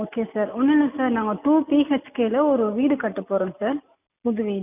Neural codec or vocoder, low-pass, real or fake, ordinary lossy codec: none; 3.6 kHz; real; none